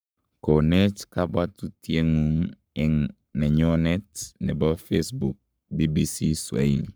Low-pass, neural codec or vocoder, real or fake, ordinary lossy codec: none; codec, 44.1 kHz, 7.8 kbps, Pupu-Codec; fake; none